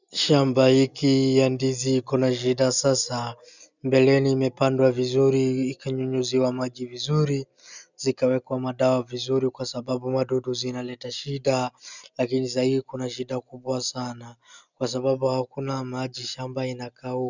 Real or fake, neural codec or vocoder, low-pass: real; none; 7.2 kHz